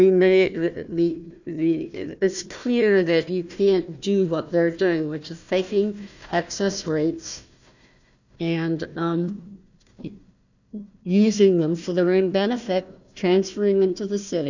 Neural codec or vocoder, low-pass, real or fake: codec, 16 kHz, 1 kbps, FunCodec, trained on Chinese and English, 50 frames a second; 7.2 kHz; fake